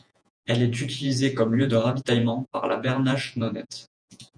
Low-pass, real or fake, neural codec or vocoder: 9.9 kHz; fake; vocoder, 48 kHz, 128 mel bands, Vocos